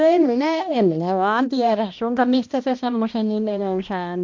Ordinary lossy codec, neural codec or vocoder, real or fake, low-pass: MP3, 48 kbps; codec, 16 kHz, 1 kbps, X-Codec, HuBERT features, trained on balanced general audio; fake; 7.2 kHz